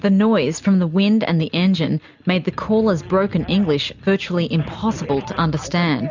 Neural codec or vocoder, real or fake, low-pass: none; real; 7.2 kHz